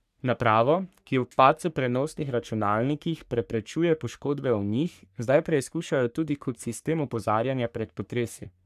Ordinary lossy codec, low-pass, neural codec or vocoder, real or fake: none; 14.4 kHz; codec, 44.1 kHz, 3.4 kbps, Pupu-Codec; fake